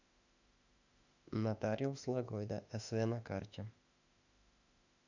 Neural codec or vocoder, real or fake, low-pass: autoencoder, 48 kHz, 32 numbers a frame, DAC-VAE, trained on Japanese speech; fake; 7.2 kHz